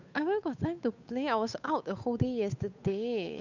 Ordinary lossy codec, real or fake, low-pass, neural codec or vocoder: none; fake; 7.2 kHz; codec, 16 kHz, 8 kbps, FunCodec, trained on Chinese and English, 25 frames a second